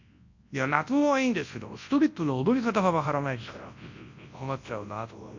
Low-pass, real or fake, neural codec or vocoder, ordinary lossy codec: 7.2 kHz; fake; codec, 24 kHz, 0.9 kbps, WavTokenizer, large speech release; MP3, 48 kbps